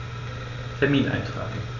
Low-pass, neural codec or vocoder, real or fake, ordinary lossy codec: 7.2 kHz; none; real; none